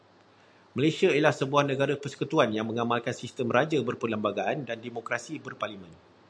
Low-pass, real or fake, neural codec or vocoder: 9.9 kHz; real; none